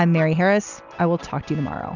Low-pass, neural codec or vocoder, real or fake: 7.2 kHz; none; real